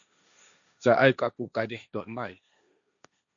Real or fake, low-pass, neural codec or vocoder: fake; 7.2 kHz; codec, 16 kHz, 1.1 kbps, Voila-Tokenizer